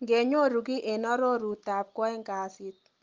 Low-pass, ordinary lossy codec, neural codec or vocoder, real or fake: 7.2 kHz; Opus, 24 kbps; none; real